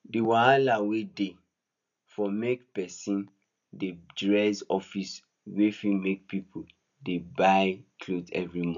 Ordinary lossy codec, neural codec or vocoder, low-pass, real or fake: none; none; 7.2 kHz; real